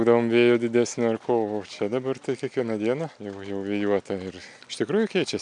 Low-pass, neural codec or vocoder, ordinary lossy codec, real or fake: 9.9 kHz; none; MP3, 96 kbps; real